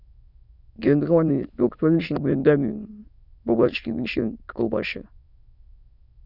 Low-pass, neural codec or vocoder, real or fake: 5.4 kHz; autoencoder, 22.05 kHz, a latent of 192 numbers a frame, VITS, trained on many speakers; fake